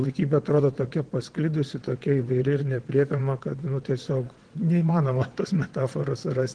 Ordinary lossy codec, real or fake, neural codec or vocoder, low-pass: Opus, 16 kbps; fake; vocoder, 22.05 kHz, 80 mel bands, WaveNeXt; 9.9 kHz